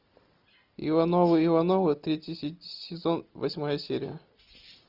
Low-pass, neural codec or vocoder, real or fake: 5.4 kHz; none; real